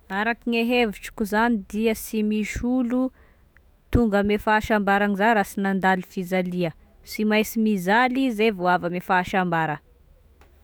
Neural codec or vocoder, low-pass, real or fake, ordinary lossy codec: autoencoder, 48 kHz, 128 numbers a frame, DAC-VAE, trained on Japanese speech; none; fake; none